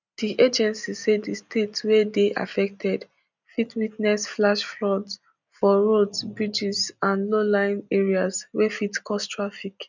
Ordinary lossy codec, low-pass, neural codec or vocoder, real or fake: none; 7.2 kHz; none; real